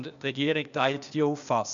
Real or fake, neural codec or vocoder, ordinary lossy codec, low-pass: fake; codec, 16 kHz, 0.8 kbps, ZipCodec; none; 7.2 kHz